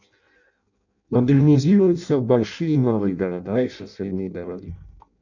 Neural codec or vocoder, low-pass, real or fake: codec, 16 kHz in and 24 kHz out, 0.6 kbps, FireRedTTS-2 codec; 7.2 kHz; fake